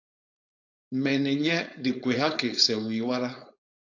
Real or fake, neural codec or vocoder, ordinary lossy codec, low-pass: fake; codec, 16 kHz, 4.8 kbps, FACodec; AAC, 48 kbps; 7.2 kHz